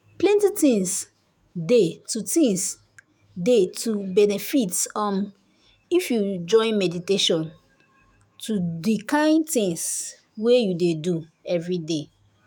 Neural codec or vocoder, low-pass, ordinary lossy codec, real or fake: autoencoder, 48 kHz, 128 numbers a frame, DAC-VAE, trained on Japanese speech; none; none; fake